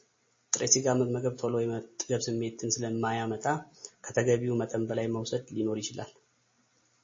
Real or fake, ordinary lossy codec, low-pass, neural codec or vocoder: real; MP3, 32 kbps; 7.2 kHz; none